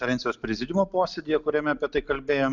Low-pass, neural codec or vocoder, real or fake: 7.2 kHz; none; real